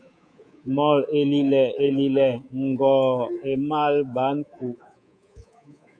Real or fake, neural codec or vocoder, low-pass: fake; codec, 24 kHz, 3.1 kbps, DualCodec; 9.9 kHz